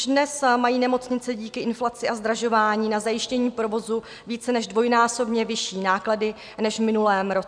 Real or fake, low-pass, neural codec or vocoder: real; 9.9 kHz; none